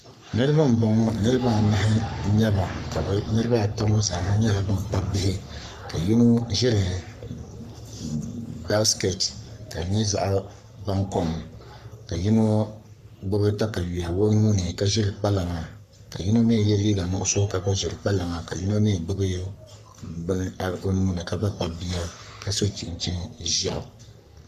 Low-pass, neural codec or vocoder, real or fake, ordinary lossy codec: 14.4 kHz; codec, 44.1 kHz, 3.4 kbps, Pupu-Codec; fake; MP3, 96 kbps